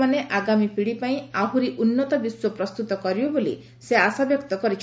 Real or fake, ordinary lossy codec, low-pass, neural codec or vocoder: real; none; none; none